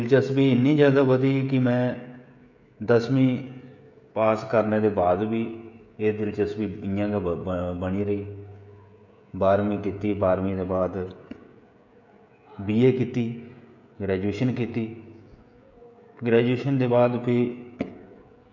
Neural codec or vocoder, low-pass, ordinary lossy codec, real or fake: codec, 16 kHz, 16 kbps, FreqCodec, smaller model; 7.2 kHz; none; fake